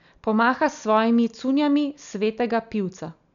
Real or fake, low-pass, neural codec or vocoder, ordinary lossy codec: real; 7.2 kHz; none; none